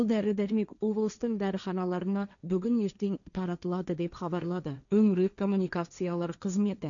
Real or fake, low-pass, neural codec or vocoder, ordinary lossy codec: fake; 7.2 kHz; codec, 16 kHz, 1.1 kbps, Voila-Tokenizer; MP3, 96 kbps